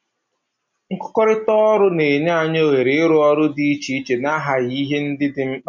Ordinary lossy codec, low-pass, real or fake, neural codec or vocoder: MP3, 64 kbps; 7.2 kHz; real; none